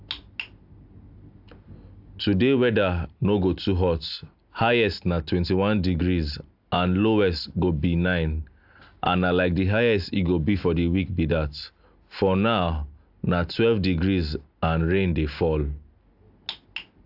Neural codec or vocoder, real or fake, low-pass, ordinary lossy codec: none; real; 5.4 kHz; AAC, 48 kbps